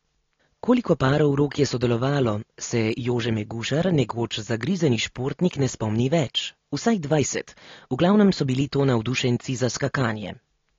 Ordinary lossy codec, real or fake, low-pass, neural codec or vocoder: AAC, 32 kbps; real; 7.2 kHz; none